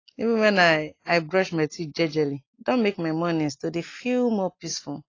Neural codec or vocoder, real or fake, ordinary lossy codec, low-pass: none; real; AAC, 32 kbps; 7.2 kHz